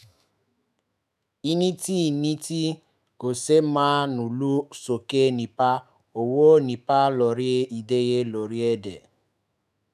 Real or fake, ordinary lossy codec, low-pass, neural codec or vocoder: fake; none; 14.4 kHz; autoencoder, 48 kHz, 128 numbers a frame, DAC-VAE, trained on Japanese speech